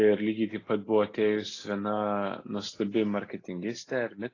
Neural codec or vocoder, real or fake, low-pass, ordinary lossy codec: none; real; 7.2 kHz; AAC, 32 kbps